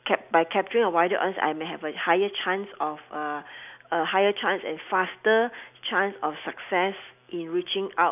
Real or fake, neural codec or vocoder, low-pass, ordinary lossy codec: real; none; 3.6 kHz; none